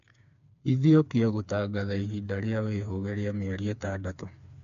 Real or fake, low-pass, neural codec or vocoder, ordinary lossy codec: fake; 7.2 kHz; codec, 16 kHz, 4 kbps, FreqCodec, smaller model; AAC, 96 kbps